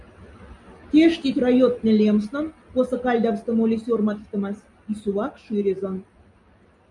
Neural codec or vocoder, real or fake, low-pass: none; real; 10.8 kHz